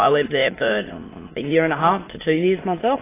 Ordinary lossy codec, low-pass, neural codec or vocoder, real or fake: AAC, 16 kbps; 3.6 kHz; autoencoder, 22.05 kHz, a latent of 192 numbers a frame, VITS, trained on many speakers; fake